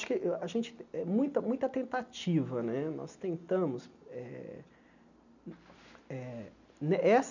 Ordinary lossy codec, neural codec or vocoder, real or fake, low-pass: none; none; real; 7.2 kHz